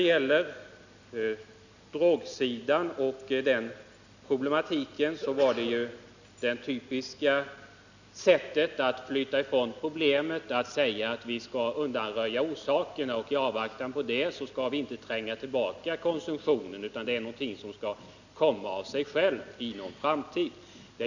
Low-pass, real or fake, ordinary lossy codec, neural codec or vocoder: 7.2 kHz; real; none; none